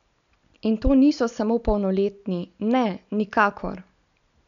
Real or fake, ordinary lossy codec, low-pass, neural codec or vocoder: real; none; 7.2 kHz; none